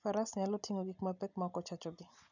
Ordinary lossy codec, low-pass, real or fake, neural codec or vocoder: none; 7.2 kHz; real; none